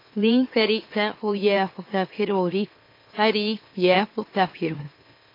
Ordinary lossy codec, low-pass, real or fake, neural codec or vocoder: AAC, 32 kbps; 5.4 kHz; fake; autoencoder, 44.1 kHz, a latent of 192 numbers a frame, MeloTTS